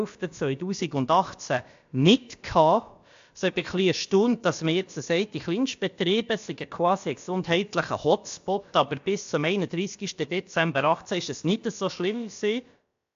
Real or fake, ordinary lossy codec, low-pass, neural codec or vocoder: fake; AAC, 64 kbps; 7.2 kHz; codec, 16 kHz, about 1 kbps, DyCAST, with the encoder's durations